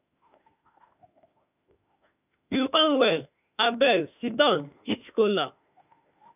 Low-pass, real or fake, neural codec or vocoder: 3.6 kHz; fake; autoencoder, 48 kHz, 32 numbers a frame, DAC-VAE, trained on Japanese speech